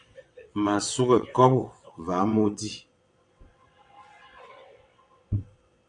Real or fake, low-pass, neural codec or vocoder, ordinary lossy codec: fake; 9.9 kHz; vocoder, 22.05 kHz, 80 mel bands, WaveNeXt; AAC, 48 kbps